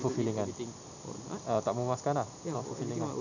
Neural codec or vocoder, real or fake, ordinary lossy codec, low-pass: none; real; none; 7.2 kHz